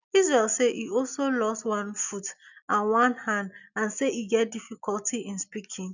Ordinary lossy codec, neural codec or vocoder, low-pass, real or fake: none; none; 7.2 kHz; real